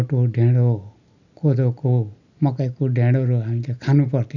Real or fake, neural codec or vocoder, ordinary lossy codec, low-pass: real; none; none; 7.2 kHz